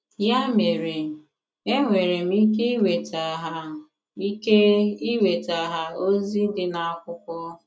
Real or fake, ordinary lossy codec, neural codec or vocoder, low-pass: real; none; none; none